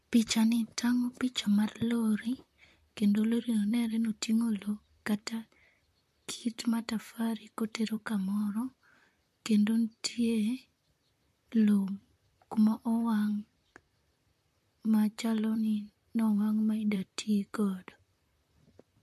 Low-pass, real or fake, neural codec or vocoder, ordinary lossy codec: 14.4 kHz; fake; vocoder, 44.1 kHz, 128 mel bands, Pupu-Vocoder; MP3, 64 kbps